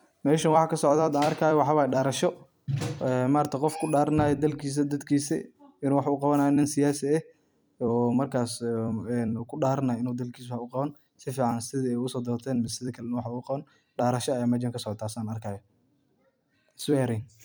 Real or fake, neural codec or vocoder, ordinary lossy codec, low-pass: fake; vocoder, 44.1 kHz, 128 mel bands every 256 samples, BigVGAN v2; none; none